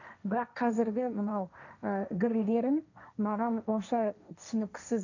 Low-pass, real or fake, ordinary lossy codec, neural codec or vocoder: 7.2 kHz; fake; none; codec, 16 kHz, 1.1 kbps, Voila-Tokenizer